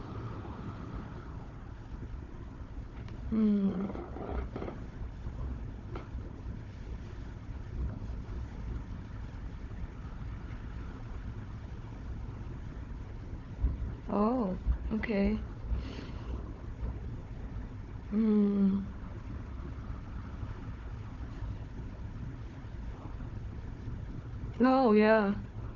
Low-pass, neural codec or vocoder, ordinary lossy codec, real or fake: 7.2 kHz; codec, 16 kHz, 4 kbps, FunCodec, trained on Chinese and English, 50 frames a second; none; fake